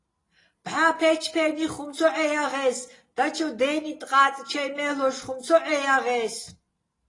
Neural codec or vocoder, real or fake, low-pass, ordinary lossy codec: none; real; 10.8 kHz; AAC, 32 kbps